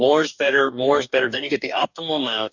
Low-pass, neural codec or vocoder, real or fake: 7.2 kHz; codec, 44.1 kHz, 2.6 kbps, DAC; fake